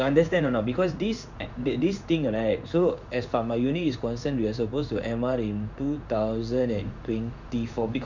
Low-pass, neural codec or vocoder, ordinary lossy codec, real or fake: 7.2 kHz; codec, 16 kHz in and 24 kHz out, 1 kbps, XY-Tokenizer; none; fake